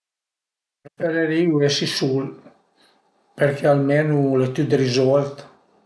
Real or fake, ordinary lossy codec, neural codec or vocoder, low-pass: real; none; none; none